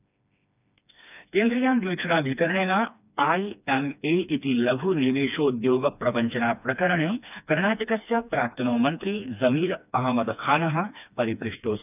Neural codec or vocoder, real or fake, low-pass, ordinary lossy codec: codec, 16 kHz, 2 kbps, FreqCodec, smaller model; fake; 3.6 kHz; none